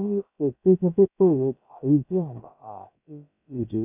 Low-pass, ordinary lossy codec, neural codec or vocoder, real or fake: 3.6 kHz; none; codec, 16 kHz, about 1 kbps, DyCAST, with the encoder's durations; fake